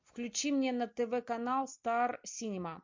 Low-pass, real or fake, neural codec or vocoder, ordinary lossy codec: 7.2 kHz; real; none; MP3, 48 kbps